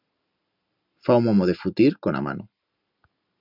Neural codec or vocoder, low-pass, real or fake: none; 5.4 kHz; real